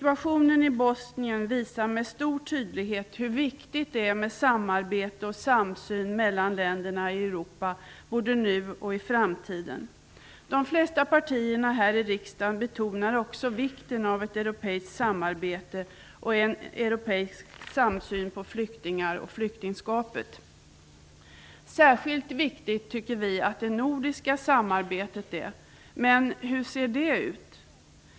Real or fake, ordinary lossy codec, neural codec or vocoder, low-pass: real; none; none; none